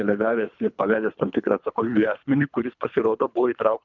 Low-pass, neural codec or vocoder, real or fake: 7.2 kHz; codec, 24 kHz, 3 kbps, HILCodec; fake